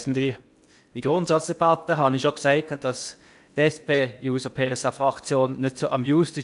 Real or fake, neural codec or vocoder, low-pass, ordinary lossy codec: fake; codec, 16 kHz in and 24 kHz out, 0.8 kbps, FocalCodec, streaming, 65536 codes; 10.8 kHz; AAC, 96 kbps